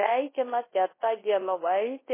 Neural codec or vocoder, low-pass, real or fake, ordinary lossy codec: codec, 24 kHz, 0.9 kbps, WavTokenizer, large speech release; 3.6 kHz; fake; MP3, 16 kbps